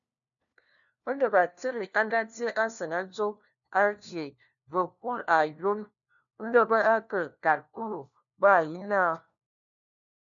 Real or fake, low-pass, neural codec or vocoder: fake; 7.2 kHz; codec, 16 kHz, 1 kbps, FunCodec, trained on LibriTTS, 50 frames a second